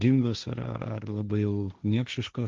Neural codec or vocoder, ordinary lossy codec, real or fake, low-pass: codec, 16 kHz, 1.1 kbps, Voila-Tokenizer; Opus, 32 kbps; fake; 7.2 kHz